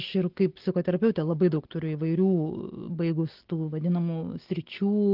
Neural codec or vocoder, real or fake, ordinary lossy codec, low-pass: none; real; Opus, 16 kbps; 5.4 kHz